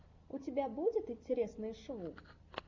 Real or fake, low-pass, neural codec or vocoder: real; 7.2 kHz; none